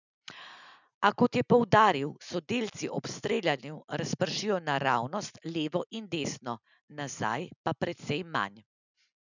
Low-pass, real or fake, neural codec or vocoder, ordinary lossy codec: 7.2 kHz; real; none; none